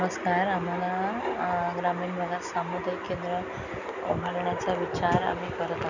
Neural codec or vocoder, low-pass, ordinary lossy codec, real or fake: none; 7.2 kHz; none; real